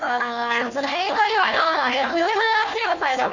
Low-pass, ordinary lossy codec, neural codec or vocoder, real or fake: 7.2 kHz; none; codec, 16 kHz, 1 kbps, FunCodec, trained on Chinese and English, 50 frames a second; fake